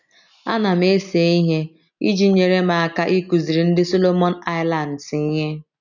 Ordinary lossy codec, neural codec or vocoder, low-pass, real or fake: none; none; 7.2 kHz; real